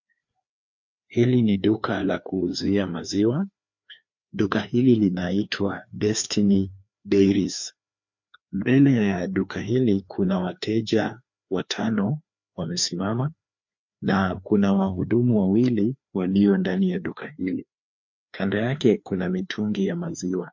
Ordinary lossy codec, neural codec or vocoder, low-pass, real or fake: MP3, 48 kbps; codec, 16 kHz, 2 kbps, FreqCodec, larger model; 7.2 kHz; fake